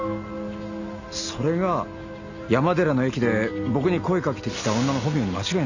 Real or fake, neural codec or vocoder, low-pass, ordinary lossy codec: real; none; 7.2 kHz; none